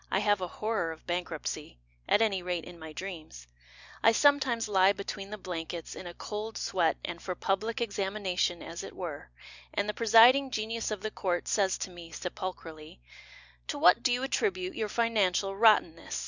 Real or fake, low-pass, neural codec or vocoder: real; 7.2 kHz; none